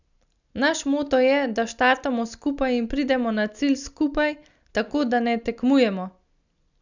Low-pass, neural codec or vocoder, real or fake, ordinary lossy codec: 7.2 kHz; none; real; none